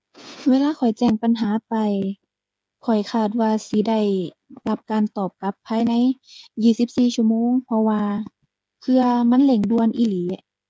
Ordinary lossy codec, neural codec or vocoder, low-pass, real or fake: none; codec, 16 kHz, 8 kbps, FreqCodec, smaller model; none; fake